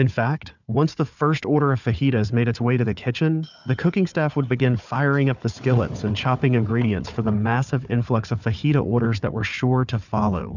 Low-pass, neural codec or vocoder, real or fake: 7.2 kHz; codec, 16 kHz, 4 kbps, FunCodec, trained on Chinese and English, 50 frames a second; fake